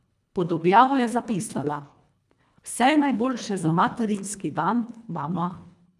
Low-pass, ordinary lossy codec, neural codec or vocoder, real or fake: none; none; codec, 24 kHz, 1.5 kbps, HILCodec; fake